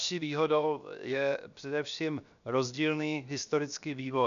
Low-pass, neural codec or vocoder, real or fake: 7.2 kHz; codec, 16 kHz, 0.7 kbps, FocalCodec; fake